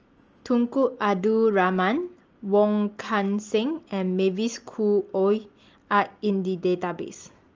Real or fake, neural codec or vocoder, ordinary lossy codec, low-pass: real; none; Opus, 24 kbps; 7.2 kHz